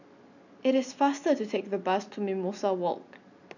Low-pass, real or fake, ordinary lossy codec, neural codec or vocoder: 7.2 kHz; real; none; none